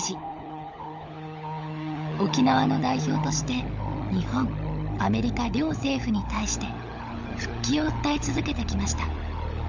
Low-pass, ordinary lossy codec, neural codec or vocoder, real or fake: 7.2 kHz; none; codec, 16 kHz, 16 kbps, FunCodec, trained on LibriTTS, 50 frames a second; fake